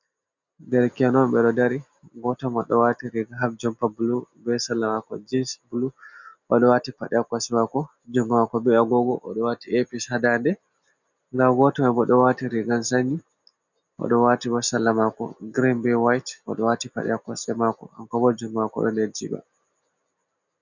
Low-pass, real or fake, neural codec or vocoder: 7.2 kHz; real; none